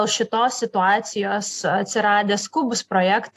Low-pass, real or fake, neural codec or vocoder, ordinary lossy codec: 14.4 kHz; real; none; AAC, 64 kbps